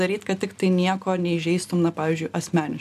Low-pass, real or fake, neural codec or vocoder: 14.4 kHz; real; none